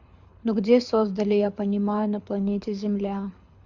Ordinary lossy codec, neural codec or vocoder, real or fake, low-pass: Opus, 64 kbps; codec, 24 kHz, 6 kbps, HILCodec; fake; 7.2 kHz